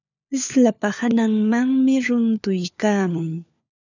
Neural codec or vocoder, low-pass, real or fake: codec, 16 kHz, 4 kbps, FunCodec, trained on LibriTTS, 50 frames a second; 7.2 kHz; fake